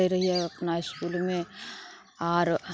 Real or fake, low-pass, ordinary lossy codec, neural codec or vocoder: real; none; none; none